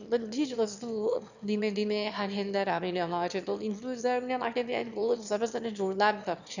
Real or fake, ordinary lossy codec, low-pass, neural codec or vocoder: fake; none; 7.2 kHz; autoencoder, 22.05 kHz, a latent of 192 numbers a frame, VITS, trained on one speaker